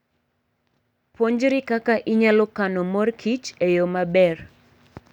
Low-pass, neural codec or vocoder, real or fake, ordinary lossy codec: 19.8 kHz; none; real; none